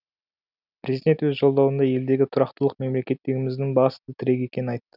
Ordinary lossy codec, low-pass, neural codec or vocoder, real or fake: none; 5.4 kHz; none; real